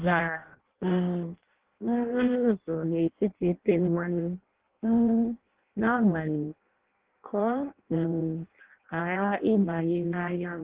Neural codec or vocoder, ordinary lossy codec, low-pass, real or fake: codec, 16 kHz in and 24 kHz out, 0.6 kbps, FireRedTTS-2 codec; Opus, 16 kbps; 3.6 kHz; fake